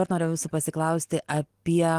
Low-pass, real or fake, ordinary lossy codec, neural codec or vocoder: 14.4 kHz; real; Opus, 24 kbps; none